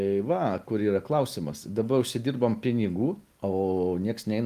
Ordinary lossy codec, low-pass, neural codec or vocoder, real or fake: Opus, 32 kbps; 14.4 kHz; none; real